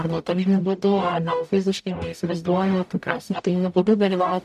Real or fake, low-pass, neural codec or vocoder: fake; 14.4 kHz; codec, 44.1 kHz, 0.9 kbps, DAC